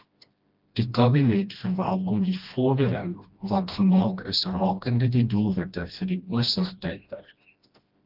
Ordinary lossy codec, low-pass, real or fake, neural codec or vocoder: Opus, 24 kbps; 5.4 kHz; fake; codec, 16 kHz, 1 kbps, FreqCodec, smaller model